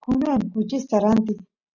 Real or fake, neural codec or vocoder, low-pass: real; none; 7.2 kHz